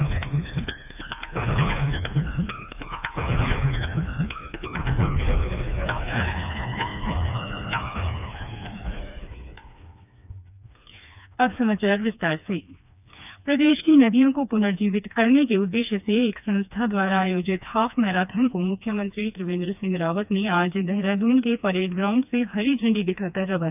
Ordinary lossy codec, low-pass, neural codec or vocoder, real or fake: none; 3.6 kHz; codec, 16 kHz, 2 kbps, FreqCodec, smaller model; fake